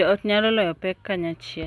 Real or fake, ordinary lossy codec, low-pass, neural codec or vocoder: real; none; none; none